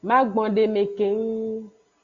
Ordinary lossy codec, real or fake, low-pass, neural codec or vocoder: Opus, 64 kbps; real; 7.2 kHz; none